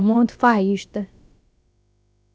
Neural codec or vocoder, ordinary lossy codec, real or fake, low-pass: codec, 16 kHz, about 1 kbps, DyCAST, with the encoder's durations; none; fake; none